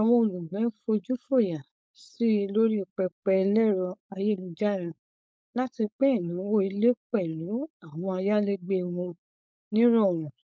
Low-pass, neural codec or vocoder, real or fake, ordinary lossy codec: none; codec, 16 kHz, 4.8 kbps, FACodec; fake; none